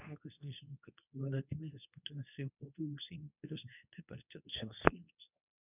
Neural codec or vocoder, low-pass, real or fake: codec, 24 kHz, 0.9 kbps, WavTokenizer, medium speech release version 2; 3.6 kHz; fake